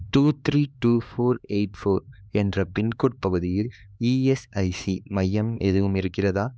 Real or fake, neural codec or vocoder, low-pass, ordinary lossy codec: fake; codec, 16 kHz, 2 kbps, X-Codec, HuBERT features, trained on LibriSpeech; none; none